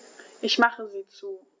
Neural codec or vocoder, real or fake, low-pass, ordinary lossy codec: none; real; none; none